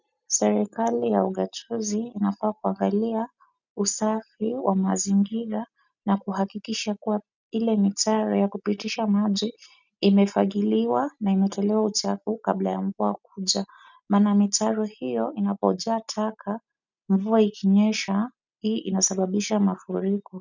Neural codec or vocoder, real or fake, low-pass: none; real; 7.2 kHz